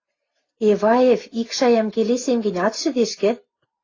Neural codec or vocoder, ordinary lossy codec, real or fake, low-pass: none; AAC, 32 kbps; real; 7.2 kHz